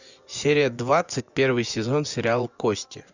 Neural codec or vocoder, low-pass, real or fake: vocoder, 24 kHz, 100 mel bands, Vocos; 7.2 kHz; fake